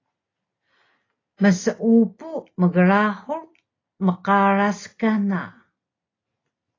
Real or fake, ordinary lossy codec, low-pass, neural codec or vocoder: real; AAC, 32 kbps; 7.2 kHz; none